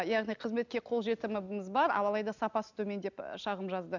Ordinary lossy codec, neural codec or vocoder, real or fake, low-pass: none; none; real; 7.2 kHz